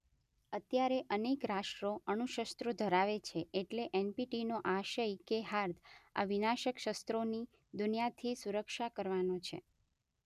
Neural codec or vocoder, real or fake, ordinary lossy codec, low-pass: none; real; none; 14.4 kHz